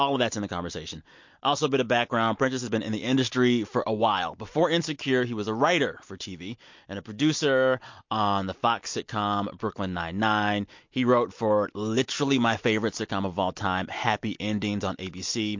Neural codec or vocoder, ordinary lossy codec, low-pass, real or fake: none; MP3, 48 kbps; 7.2 kHz; real